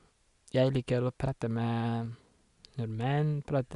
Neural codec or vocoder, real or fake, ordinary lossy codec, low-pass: none; real; none; 10.8 kHz